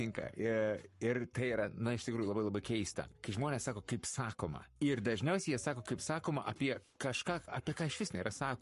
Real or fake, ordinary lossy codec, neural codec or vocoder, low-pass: fake; MP3, 48 kbps; codec, 44.1 kHz, 7.8 kbps, Pupu-Codec; 14.4 kHz